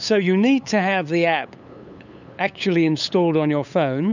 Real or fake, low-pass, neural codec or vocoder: fake; 7.2 kHz; codec, 16 kHz, 8 kbps, FunCodec, trained on LibriTTS, 25 frames a second